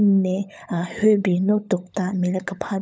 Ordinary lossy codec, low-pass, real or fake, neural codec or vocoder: none; none; fake; codec, 16 kHz, 16 kbps, FunCodec, trained on LibriTTS, 50 frames a second